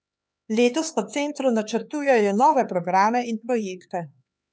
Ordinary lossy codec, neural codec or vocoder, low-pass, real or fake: none; codec, 16 kHz, 4 kbps, X-Codec, HuBERT features, trained on LibriSpeech; none; fake